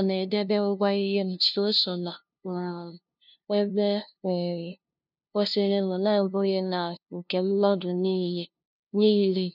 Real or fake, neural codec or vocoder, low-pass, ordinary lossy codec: fake; codec, 16 kHz, 0.5 kbps, FunCodec, trained on LibriTTS, 25 frames a second; 5.4 kHz; none